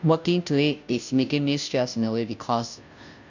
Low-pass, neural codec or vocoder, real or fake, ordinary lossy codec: 7.2 kHz; codec, 16 kHz, 0.5 kbps, FunCodec, trained on Chinese and English, 25 frames a second; fake; none